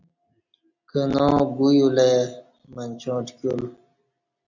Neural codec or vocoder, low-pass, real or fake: none; 7.2 kHz; real